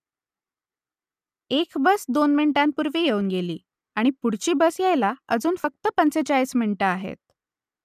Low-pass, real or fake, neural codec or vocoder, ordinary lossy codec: 14.4 kHz; real; none; none